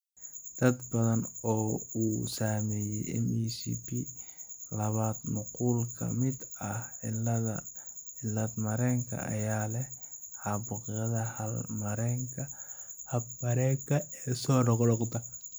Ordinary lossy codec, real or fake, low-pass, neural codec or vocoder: none; real; none; none